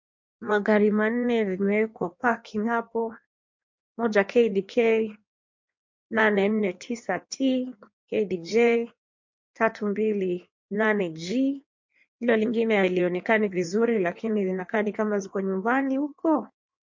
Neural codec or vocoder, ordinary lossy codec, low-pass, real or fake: codec, 16 kHz in and 24 kHz out, 1.1 kbps, FireRedTTS-2 codec; MP3, 48 kbps; 7.2 kHz; fake